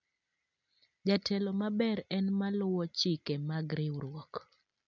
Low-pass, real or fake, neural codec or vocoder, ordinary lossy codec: 7.2 kHz; real; none; none